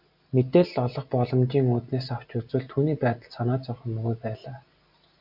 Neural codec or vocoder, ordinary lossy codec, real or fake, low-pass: none; MP3, 48 kbps; real; 5.4 kHz